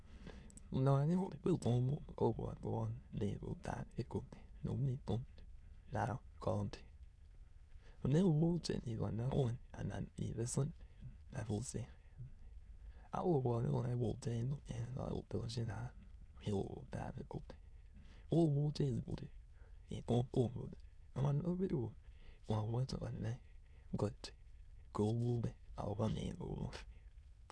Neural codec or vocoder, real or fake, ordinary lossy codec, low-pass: autoencoder, 22.05 kHz, a latent of 192 numbers a frame, VITS, trained on many speakers; fake; none; none